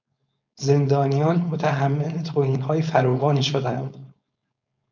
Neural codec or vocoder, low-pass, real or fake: codec, 16 kHz, 4.8 kbps, FACodec; 7.2 kHz; fake